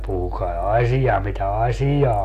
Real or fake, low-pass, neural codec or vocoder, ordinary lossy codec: real; 14.4 kHz; none; AAC, 64 kbps